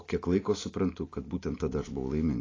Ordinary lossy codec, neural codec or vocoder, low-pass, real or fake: AAC, 32 kbps; none; 7.2 kHz; real